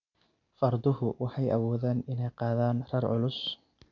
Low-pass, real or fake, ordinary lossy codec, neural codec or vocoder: 7.2 kHz; real; none; none